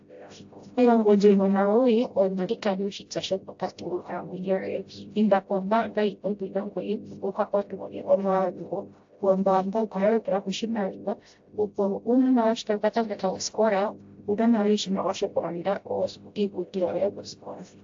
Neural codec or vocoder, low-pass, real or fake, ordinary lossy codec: codec, 16 kHz, 0.5 kbps, FreqCodec, smaller model; 7.2 kHz; fake; AAC, 48 kbps